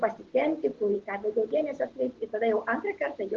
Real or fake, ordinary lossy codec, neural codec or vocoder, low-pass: real; Opus, 16 kbps; none; 7.2 kHz